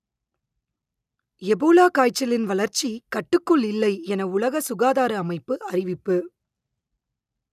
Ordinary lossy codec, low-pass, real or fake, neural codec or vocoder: none; 14.4 kHz; real; none